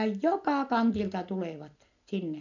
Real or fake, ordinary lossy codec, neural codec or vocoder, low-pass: real; none; none; 7.2 kHz